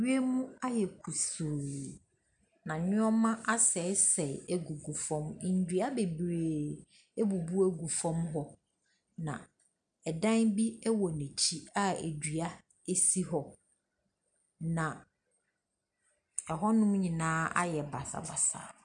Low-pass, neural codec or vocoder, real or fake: 9.9 kHz; none; real